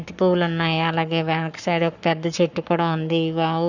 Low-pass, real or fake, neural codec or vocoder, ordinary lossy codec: 7.2 kHz; fake; codec, 44.1 kHz, 7.8 kbps, Pupu-Codec; none